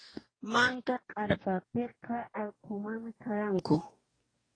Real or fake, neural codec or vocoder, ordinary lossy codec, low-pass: fake; codec, 44.1 kHz, 2.6 kbps, DAC; AAC, 32 kbps; 9.9 kHz